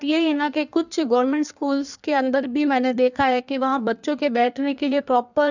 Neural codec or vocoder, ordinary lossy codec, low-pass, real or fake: codec, 16 kHz in and 24 kHz out, 1.1 kbps, FireRedTTS-2 codec; none; 7.2 kHz; fake